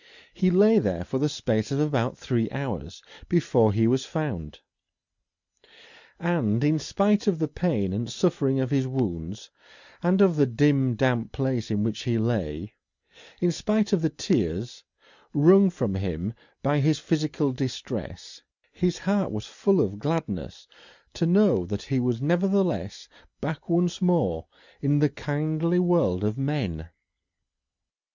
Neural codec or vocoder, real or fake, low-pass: none; real; 7.2 kHz